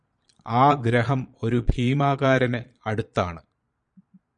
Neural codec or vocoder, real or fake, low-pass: vocoder, 22.05 kHz, 80 mel bands, Vocos; fake; 9.9 kHz